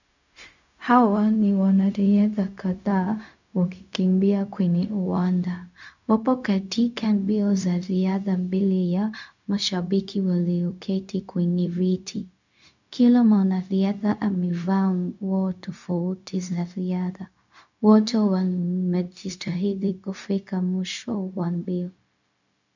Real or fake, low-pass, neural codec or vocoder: fake; 7.2 kHz; codec, 16 kHz, 0.4 kbps, LongCat-Audio-Codec